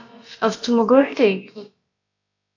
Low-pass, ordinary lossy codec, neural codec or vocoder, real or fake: 7.2 kHz; AAC, 48 kbps; codec, 16 kHz, about 1 kbps, DyCAST, with the encoder's durations; fake